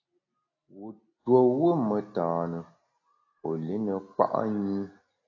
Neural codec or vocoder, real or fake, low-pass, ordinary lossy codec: none; real; 7.2 kHz; AAC, 32 kbps